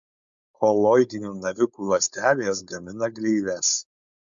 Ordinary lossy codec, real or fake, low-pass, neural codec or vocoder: MP3, 64 kbps; fake; 7.2 kHz; codec, 16 kHz, 4.8 kbps, FACodec